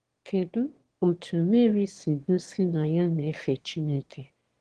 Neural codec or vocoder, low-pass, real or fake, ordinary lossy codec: autoencoder, 22.05 kHz, a latent of 192 numbers a frame, VITS, trained on one speaker; 9.9 kHz; fake; Opus, 16 kbps